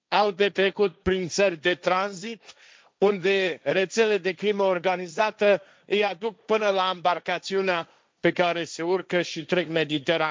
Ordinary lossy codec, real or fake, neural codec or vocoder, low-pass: none; fake; codec, 16 kHz, 1.1 kbps, Voila-Tokenizer; none